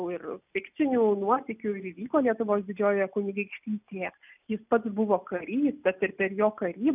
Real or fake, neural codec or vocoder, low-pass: real; none; 3.6 kHz